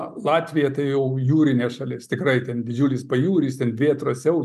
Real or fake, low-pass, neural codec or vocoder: real; 14.4 kHz; none